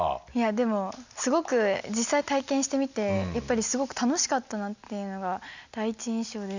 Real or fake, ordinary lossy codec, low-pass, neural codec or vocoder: real; none; 7.2 kHz; none